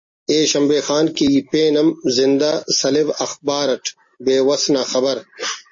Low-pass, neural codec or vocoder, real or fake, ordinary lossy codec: 7.2 kHz; none; real; MP3, 32 kbps